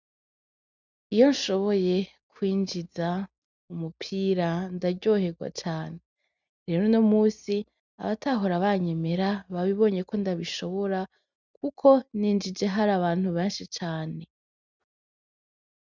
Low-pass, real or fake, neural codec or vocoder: 7.2 kHz; real; none